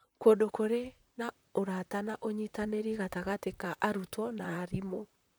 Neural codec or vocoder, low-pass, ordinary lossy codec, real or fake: vocoder, 44.1 kHz, 128 mel bands, Pupu-Vocoder; none; none; fake